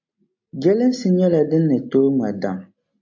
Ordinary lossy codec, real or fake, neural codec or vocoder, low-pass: AAC, 48 kbps; real; none; 7.2 kHz